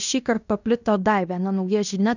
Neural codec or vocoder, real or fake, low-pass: codec, 16 kHz in and 24 kHz out, 0.9 kbps, LongCat-Audio-Codec, fine tuned four codebook decoder; fake; 7.2 kHz